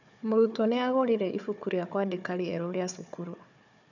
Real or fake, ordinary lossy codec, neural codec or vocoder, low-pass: fake; none; codec, 16 kHz, 4 kbps, FunCodec, trained on Chinese and English, 50 frames a second; 7.2 kHz